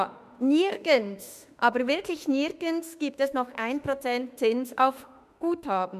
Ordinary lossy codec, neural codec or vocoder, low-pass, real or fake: none; autoencoder, 48 kHz, 32 numbers a frame, DAC-VAE, trained on Japanese speech; 14.4 kHz; fake